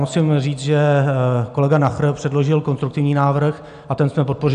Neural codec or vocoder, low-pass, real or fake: none; 9.9 kHz; real